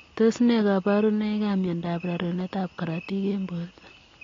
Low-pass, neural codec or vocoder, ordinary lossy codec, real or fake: 7.2 kHz; none; AAC, 32 kbps; real